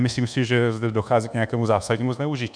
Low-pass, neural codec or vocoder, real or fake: 9.9 kHz; codec, 24 kHz, 1.2 kbps, DualCodec; fake